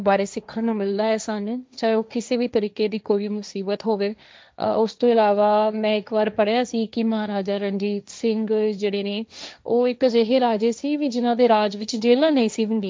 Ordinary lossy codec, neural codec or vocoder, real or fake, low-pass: none; codec, 16 kHz, 1.1 kbps, Voila-Tokenizer; fake; none